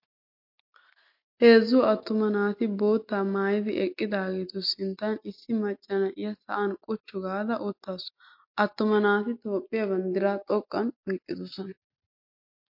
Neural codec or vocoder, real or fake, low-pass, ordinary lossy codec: none; real; 5.4 kHz; MP3, 32 kbps